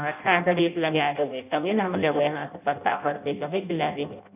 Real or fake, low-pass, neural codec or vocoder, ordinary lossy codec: fake; 3.6 kHz; codec, 16 kHz in and 24 kHz out, 0.6 kbps, FireRedTTS-2 codec; none